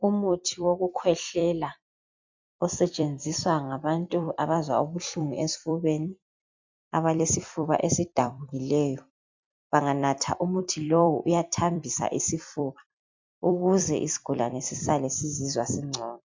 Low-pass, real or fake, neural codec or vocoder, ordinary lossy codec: 7.2 kHz; fake; vocoder, 44.1 kHz, 128 mel bands every 256 samples, BigVGAN v2; MP3, 64 kbps